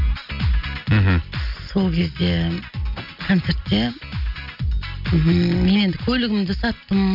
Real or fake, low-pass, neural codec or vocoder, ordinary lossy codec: real; 5.4 kHz; none; none